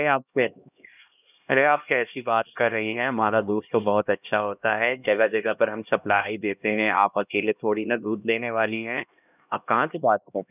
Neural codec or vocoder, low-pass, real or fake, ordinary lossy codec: codec, 16 kHz, 1 kbps, X-Codec, HuBERT features, trained on LibriSpeech; 3.6 kHz; fake; none